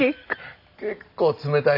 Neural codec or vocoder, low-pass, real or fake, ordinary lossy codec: none; 5.4 kHz; real; MP3, 48 kbps